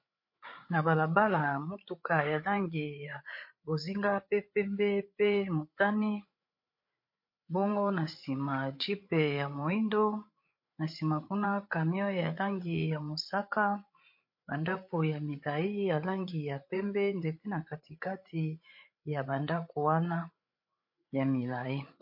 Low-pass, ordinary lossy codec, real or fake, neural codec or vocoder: 5.4 kHz; MP3, 32 kbps; fake; codec, 16 kHz, 8 kbps, FreqCodec, larger model